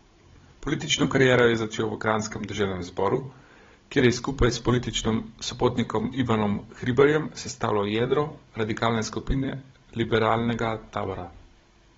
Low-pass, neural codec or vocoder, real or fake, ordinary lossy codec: 7.2 kHz; codec, 16 kHz, 16 kbps, FunCodec, trained on Chinese and English, 50 frames a second; fake; AAC, 24 kbps